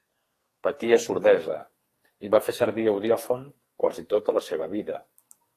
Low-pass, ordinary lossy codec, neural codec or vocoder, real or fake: 14.4 kHz; AAC, 48 kbps; codec, 44.1 kHz, 2.6 kbps, SNAC; fake